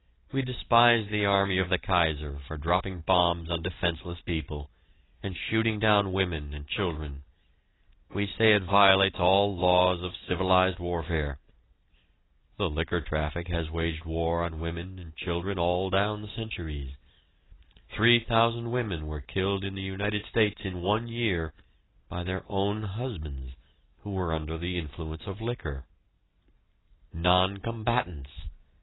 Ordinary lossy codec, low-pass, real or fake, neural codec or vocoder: AAC, 16 kbps; 7.2 kHz; real; none